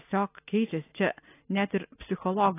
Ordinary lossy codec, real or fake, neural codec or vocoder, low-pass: AAC, 16 kbps; real; none; 3.6 kHz